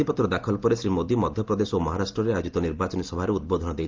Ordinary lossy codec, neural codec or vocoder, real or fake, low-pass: Opus, 32 kbps; none; real; 7.2 kHz